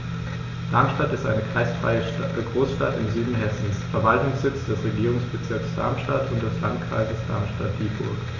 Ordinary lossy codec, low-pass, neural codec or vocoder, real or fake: Opus, 64 kbps; 7.2 kHz; none; real